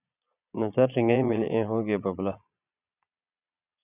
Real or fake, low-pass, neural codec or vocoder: fake; 3.6 kHz; vocoder, 22.05 kHz, 80 mel bands, Vocos